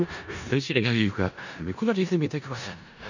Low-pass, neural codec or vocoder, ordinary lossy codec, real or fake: 7.2 kHz; codec, 16 kHz in and 24 kHz out, 0.4 kbps, LongCat-Audio-Codec, four codebook decoder; none; fake